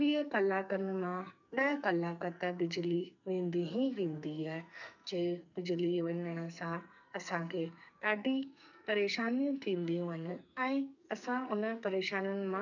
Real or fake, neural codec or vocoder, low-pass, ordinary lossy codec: fake; codec, 44.1 kHz, 2.6 kbps, SNAC; 7.2 kHz; none